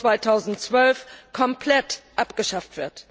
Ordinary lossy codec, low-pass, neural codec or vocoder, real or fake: none; none; none; real